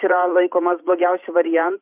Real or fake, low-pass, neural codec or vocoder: fake; 3.6 kHz; vocoder, 44.1 kHz, 128 mel bands every 512 samples, BigVGAN v2